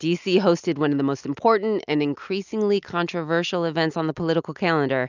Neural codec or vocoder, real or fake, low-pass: none; real; 7.2 kHz